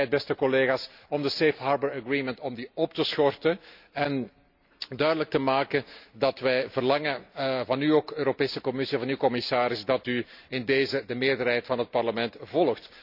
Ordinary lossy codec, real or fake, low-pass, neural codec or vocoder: none; real; 5.4 kHz; none